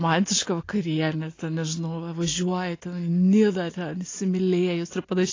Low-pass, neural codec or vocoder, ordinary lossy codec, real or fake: 7.2 kHz; none; AAC, 32 kbps; real